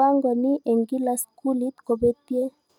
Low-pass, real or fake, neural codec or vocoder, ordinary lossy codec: 19.8 kHz; real; none; none